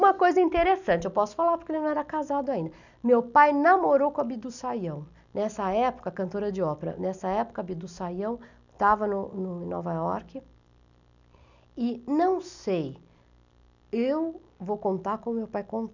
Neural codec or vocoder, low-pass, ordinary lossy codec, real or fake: none; 7.2 kHz; none; real